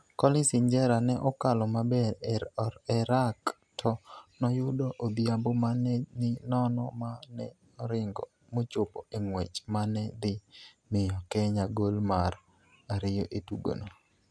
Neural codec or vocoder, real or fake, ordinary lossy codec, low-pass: none; real; none; none